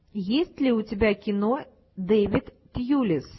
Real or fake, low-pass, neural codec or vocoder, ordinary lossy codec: real; 7.2 kHz; none; MP3, 24 kbps